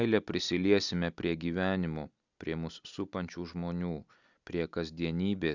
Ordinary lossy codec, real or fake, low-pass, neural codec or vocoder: Opus, 64 kbps; real; 7.2 kHz; none